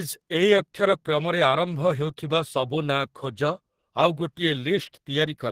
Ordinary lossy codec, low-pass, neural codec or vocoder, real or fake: Opus, 16 kbps; 14.4 kHz; codec, 32 kHz, 1.9 kbps, SNAC; fake